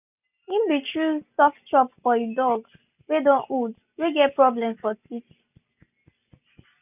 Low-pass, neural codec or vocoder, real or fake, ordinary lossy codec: 3.6 kHz; none; real; none